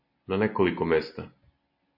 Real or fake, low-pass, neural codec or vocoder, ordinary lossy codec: real; 5.4 kHz; none; MP3, 48 kbps